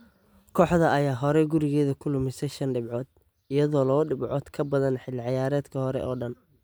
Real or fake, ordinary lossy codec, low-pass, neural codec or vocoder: real; none; none; none